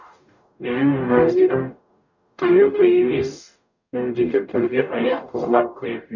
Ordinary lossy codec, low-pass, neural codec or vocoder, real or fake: none; 7.2 kHz; codec, 44.1 kHz, 0.9 kbps, DAC; fake